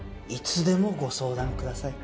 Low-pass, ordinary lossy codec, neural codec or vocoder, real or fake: none; none; none; real